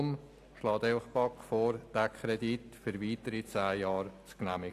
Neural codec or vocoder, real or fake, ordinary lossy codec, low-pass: none; real; AAC, 64 kbps; 14.4 kHz